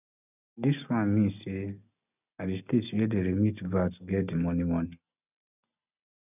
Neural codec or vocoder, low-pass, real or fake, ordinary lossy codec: none; 3.6 kHz; real; none